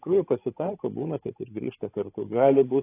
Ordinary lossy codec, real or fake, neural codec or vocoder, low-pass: AAC, 24 kbps; fake; codec, 16 kHz, 16 kbps, FreqCodec, larger model; 3.6 kHz